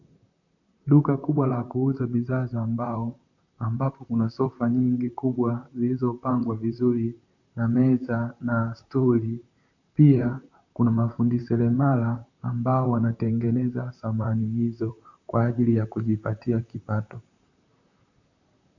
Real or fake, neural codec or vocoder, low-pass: fake; vocoder, 44.1 kHz, 128 mel bands, Pupu-Vocoder; 7.2 kHz